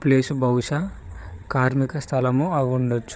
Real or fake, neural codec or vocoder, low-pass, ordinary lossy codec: fake; codec, 16 kHz, 8 kbps, FreqCodec, larger model; none; none